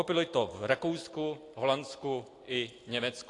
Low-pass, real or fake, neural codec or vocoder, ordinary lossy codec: 10.8 kHz; real; none; AAC, 48 kbps